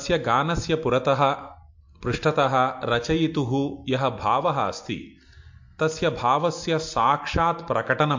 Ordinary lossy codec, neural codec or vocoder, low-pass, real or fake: MP3, 48 kbps; none; 7.2 kHz; real